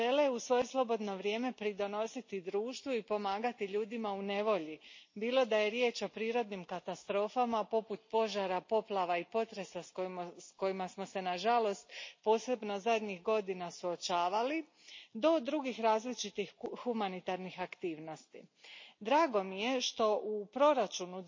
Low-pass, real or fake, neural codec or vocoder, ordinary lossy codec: 7.2 kHz; real; none; MP3, 32 kbps